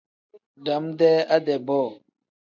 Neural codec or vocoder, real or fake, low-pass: none; real; 7.2 kHz